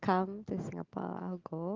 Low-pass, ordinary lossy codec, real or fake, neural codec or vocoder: 7.2 kHz; Opus, 16 kbps; real; none